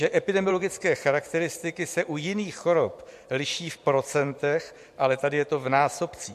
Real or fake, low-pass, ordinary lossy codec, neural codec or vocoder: fake; 14.4 kHz; MP3, 64 kbps; vocoder, 44.1 kHz, 128 mel bands every 512 samples, BigVGAN v2